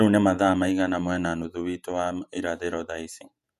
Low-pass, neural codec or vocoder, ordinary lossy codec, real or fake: 14.4 kHz; vocoder, 48 kHz, 128 mel bands, Vocos; none; fake